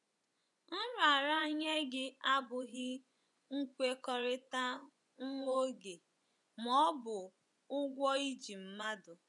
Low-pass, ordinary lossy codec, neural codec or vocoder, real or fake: 10.8 kHz; none; vocoder, 24 kHz, 100 mel bands, Vocos; fake